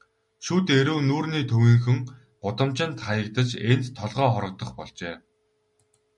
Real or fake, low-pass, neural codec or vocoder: real; 10.8 kHz; none